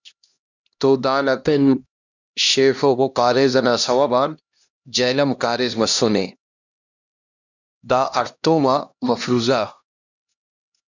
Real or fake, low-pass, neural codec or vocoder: fake; 7.2 kHz; codec, 16 kHz, 1 kbps, X-Codec, HuBERT features, trained on LibriSpeech